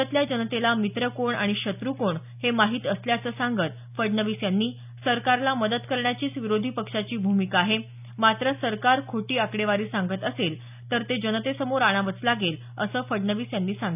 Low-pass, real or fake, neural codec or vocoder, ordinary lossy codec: 3.6 kHz; real; none; none